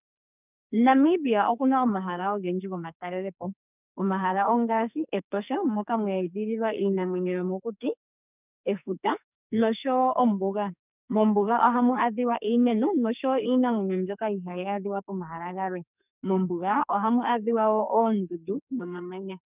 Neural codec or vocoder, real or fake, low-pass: codec, 44.1 kHz, 2.6 kbps, SNAC; fake; 3.6 kHz